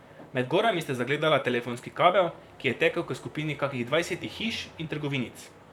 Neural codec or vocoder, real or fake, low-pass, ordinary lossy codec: vocoder, 44.1 kHz, 128 mel bands, Pupu-Vocoder; fake; 19.8 kHz; none